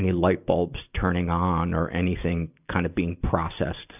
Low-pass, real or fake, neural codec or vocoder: 3.6 kHz; real; none